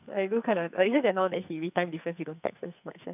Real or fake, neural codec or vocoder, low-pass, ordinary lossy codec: fake; codec, 44.1 kHz, 2.6 kbps, SNAC; 3.6 kHz; none